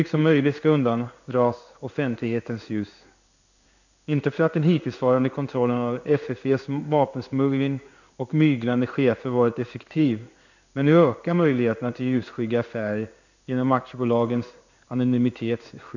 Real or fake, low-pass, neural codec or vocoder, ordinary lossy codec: fake; 7.2 kHz; codec, 16 kHz in and 24 kHz out, 1 kbps, XY-Tokenizer; none